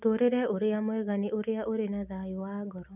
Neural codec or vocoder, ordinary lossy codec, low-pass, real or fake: none; none; 3.6 kHz; real